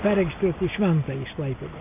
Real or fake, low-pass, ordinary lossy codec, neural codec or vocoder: real; 3.6 kHz; AAC, 32 kbps; none